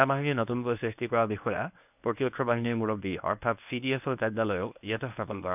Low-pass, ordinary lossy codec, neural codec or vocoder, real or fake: 3.6 kHz; none; codec, 24 kHz, 0.9 kbps, WavTokenizer, medium speech release version 1; fake